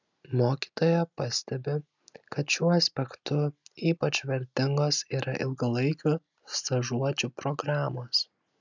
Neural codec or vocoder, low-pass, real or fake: none; 7.2 kHz; real